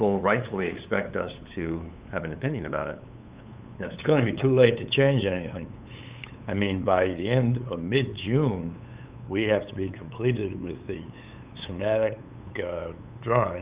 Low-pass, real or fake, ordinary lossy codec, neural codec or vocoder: 3.6 kHz; fake; Opus, 64 kbps; codec, 16 kHz, 8 kbps, FunCodec, trained on LibriTTS, 25 frames a second